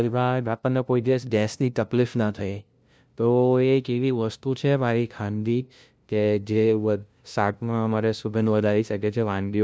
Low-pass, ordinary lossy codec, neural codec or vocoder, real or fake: none; none; codec, 16 kHz, 0.5 kbps, FunCodec, trained on LibriTTS, 25 frames a second; fake